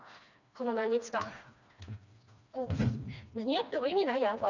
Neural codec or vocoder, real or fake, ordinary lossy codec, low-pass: codec, 16 kHz, 2 kbps, FreqCodec, smaller model; fake; none; 7.2 kHz